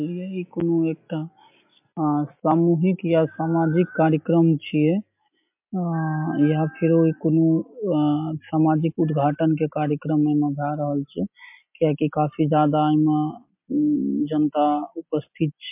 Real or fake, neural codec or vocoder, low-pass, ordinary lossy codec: real; none; 3.6 kHz; MP3, 32 kbps